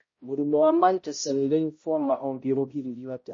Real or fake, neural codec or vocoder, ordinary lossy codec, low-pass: fake; codec, 16 kHz, 0.5 kbps, X-Codec, HuBERT features, trained on balanced general audio; MP3, 32 kbps; 7.2 kHz